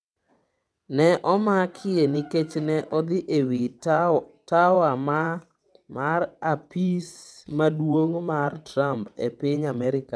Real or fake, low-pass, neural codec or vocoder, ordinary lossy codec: fake; none; vocoder, 22.05 kHz, 80 mel bands, WaveNeXt; none